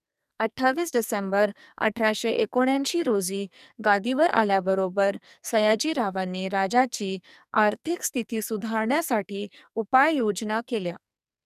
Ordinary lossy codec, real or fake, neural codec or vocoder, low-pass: none; fake; codec, 44.1 kHz, 2.6 kbps, SNAC; 14.4 kHz